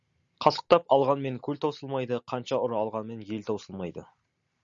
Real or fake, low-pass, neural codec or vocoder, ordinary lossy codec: real; 7.2 kHz; none; Opus, 64 kbps